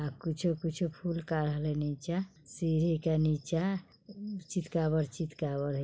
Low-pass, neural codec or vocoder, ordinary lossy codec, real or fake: none; none; none; real